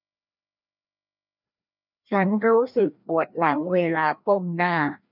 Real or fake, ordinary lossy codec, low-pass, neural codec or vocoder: fake; none; 5.4 kHz; codec, 16 kHz, 1 kbps, FreqCodec, larger model